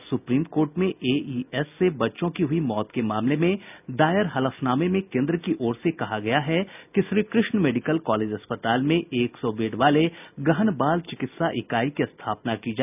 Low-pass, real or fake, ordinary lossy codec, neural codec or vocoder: 3.6 kHz; real; none; none